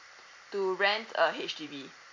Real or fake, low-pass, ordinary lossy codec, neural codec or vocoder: fake; 7.2 kHz; MP3, 48 kbps; vocoder, 44.1 kHz, 128 mel bands every 256 samples, BigVGAN v2